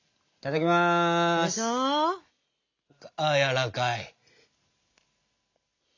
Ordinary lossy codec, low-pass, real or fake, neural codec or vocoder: AAC, 48 kbps; 7.2 kHz; real; none